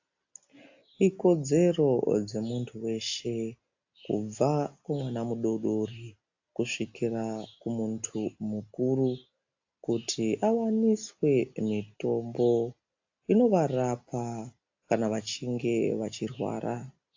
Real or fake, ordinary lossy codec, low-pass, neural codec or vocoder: real; AAC, 48 kbps; 7.2 kHz; none